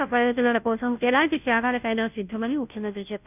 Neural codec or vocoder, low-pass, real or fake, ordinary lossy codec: codec, 16 kHz, 0.5 kbps, FunCodec, trained on Chinese and English, 25 frames a second; 3.6 kHz; fake; none